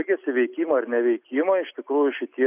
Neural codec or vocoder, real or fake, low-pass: none; real; 3.6 kHz